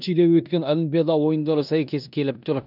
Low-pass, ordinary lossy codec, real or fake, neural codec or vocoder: 5.4 kHz; none; fake; codec, 16 kHz in and 24 kHz out, 0.9 kbps, LongCat-Audio-Codec, four codebook decoder